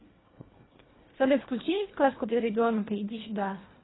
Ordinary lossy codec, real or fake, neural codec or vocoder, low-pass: AAC, 16 kbps; fake; codec, 24 kHz, 1.5 kbps, HILCodec; 7.2 kHz